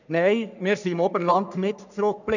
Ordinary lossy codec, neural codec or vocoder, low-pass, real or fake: none; codec, 44.1 kHz, 3.4 kbps, Pupu-Codec; 7.2 kHz; fake